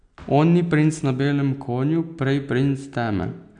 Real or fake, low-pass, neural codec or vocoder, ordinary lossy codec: real; 9.9 kHz; none; none